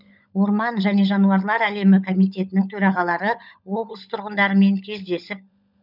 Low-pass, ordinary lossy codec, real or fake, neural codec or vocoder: 5.4 kHz; none; fake; codec, 16 kHz, 16 kbps, FunCodec, trained on LibriTTS, 50 frames a second